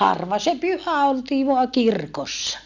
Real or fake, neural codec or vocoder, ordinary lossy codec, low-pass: real; none; none; 7.2 kHz